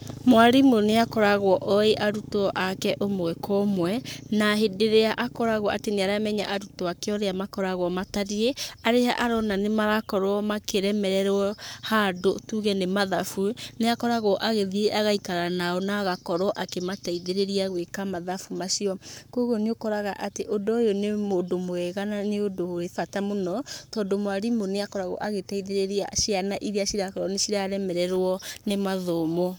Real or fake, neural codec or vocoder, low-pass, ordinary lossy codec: fake; codec, 44.1 kHz, 7.8 kbps, Pupu-Codec; none; none